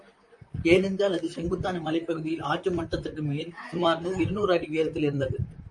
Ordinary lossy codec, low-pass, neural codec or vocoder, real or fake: MP3, 48 kbps; 10.8 kHz; vocoder, 44.1 kHz, 128 mel bands, Pupu-Vocoder; fake